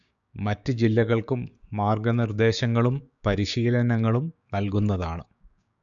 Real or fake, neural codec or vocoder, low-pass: fake; codec, 16 kHz, 6 kbps, DAC; 7.2 kHz